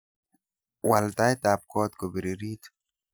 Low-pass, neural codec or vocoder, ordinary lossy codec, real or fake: none; none; none; real